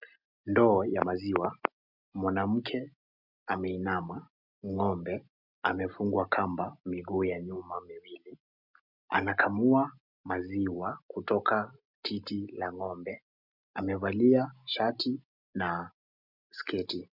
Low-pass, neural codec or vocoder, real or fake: 5.4 kHz; none; real